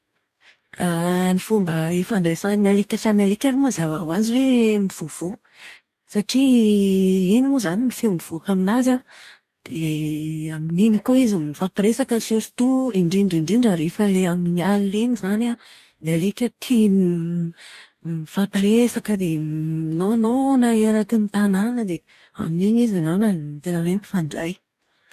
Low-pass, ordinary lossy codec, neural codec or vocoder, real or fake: 14.4 kHz; none; codec, 44.1 kHz, 2.6 kbps, DAC; fake